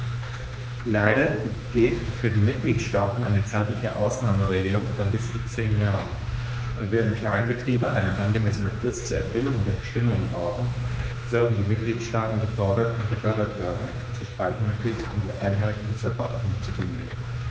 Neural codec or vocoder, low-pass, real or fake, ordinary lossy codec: codec, 16 kHz, 2 kbps, X-Codec, HuBERT features, trained on general audio; none; fake; none